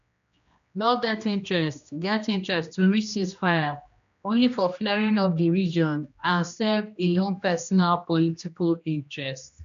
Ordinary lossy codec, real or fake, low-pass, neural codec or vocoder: MP3, 64 kbps; fake; 7.2 kHz; codec, 16 kHz, 1 kbps, X-Codec, HuBERT features, trained on general audio